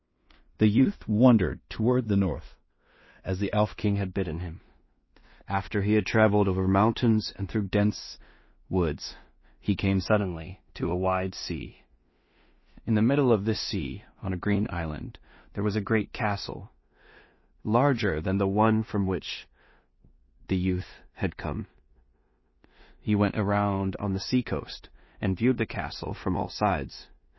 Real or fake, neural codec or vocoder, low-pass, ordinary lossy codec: fake; codec, 16 kHz in and 24 kHz out, 0.4 kbps, LongCat-Audio-Codec, two codebook decoder; 7.2 kHz; MP3, 24 kbps